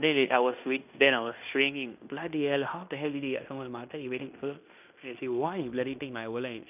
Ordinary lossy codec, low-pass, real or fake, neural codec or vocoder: none; 3.6 kHz; fake; codec, 16 kHz in and 24 kHz out, 0.9 kbps, LongCat-Audio-Codec, fine tuned four codebook decoder